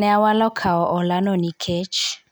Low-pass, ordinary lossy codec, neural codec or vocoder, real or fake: none; none; none; real